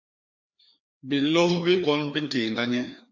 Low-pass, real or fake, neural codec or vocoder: 7.2 kHz; fake; codec, 16 kHz, 2 kbps, FreqCodec, larger model